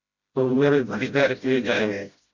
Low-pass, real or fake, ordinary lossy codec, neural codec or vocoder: 7.2 kHz; fake; Opus, 64 kbps; codec, 16 kHz, 0.5 kbps, FreqCodec, smaller model